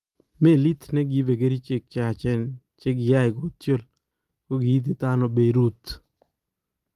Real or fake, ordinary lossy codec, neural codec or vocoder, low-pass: real; Opus, 32 kbps; none; 14.4 kHz